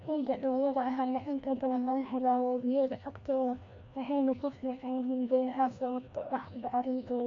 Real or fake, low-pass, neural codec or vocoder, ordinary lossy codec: fake; 7.2 kHz; codec, 16 kHz, 1 kbps, FreqCodec, larger model; AAC, 48 kbps